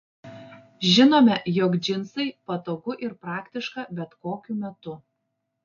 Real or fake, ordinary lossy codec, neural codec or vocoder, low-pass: real; AAC, 48 kbps; none; 7.2 kHz